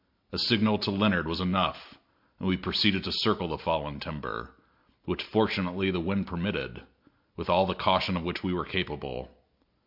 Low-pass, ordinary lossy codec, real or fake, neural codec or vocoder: 5.4 kHz; MP3, 48 kbps; real; none